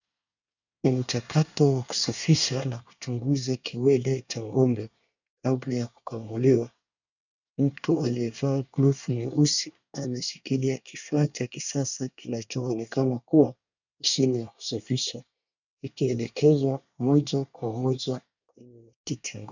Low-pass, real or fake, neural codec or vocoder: 7.2 kHz; fake; codec, 24 kHz, 1 kbps, SNAC